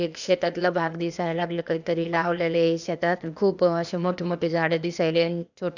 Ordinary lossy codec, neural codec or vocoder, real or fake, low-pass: none; codec, 16 kHz, 0.8 kbps, ZipCodec; fake; 7.2 kHz